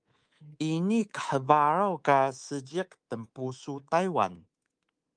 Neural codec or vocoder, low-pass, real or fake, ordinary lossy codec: codec, 24 kHz, 3.1 kbps, DualCodec; 9.9 kHz; fake; Opus, 32 kbps